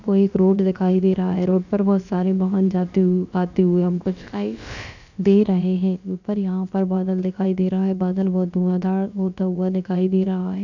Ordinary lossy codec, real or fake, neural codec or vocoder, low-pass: none; fake; codec, 16 kHz, about 1 kbps, DyCAST, with the encoder's durations; 7.2 kHz